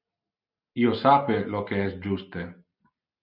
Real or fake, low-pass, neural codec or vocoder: real; 5.4 kHz; none